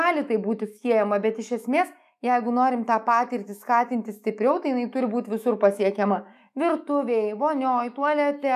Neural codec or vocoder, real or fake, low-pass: autoencoder, 48 kHz, 128 numbers a frame, DAC-VAE, trained on Japanese speech; fake; 14.4 kHz